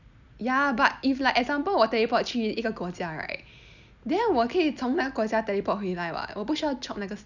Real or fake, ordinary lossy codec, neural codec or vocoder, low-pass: real; none; none; 7.2 kHz